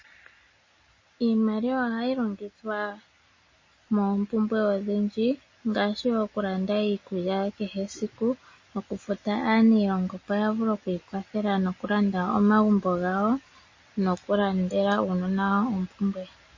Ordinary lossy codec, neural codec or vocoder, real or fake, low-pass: MP3, 32 kbps; none; real; 7.2 kHz